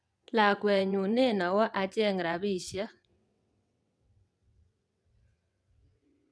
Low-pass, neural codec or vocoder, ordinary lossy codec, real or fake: none; vocoder, 22.05 kHz, 80 mel bands, WaveNeXt; none; fake